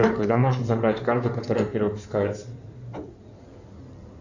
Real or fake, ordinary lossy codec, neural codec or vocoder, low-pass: fake; Opus, 64 kbps; codec, 16 kHz in and 24 kHz out, 2.2 kbps, FireRedTTS-2 codec; 7.2 kHz